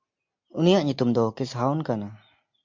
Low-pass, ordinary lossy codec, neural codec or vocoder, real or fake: 7.2 kHz; MP3, 48 kbps; none; real